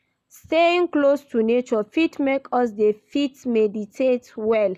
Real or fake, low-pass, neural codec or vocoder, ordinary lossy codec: real; 14.4 kHz; none; none